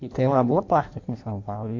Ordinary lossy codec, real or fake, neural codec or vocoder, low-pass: AAC, 48 kbps; fake; codec, 16 kHz in and 24 kHz out, 1.1 kbps, FireRedTTS-2 codec; 7.2 kHz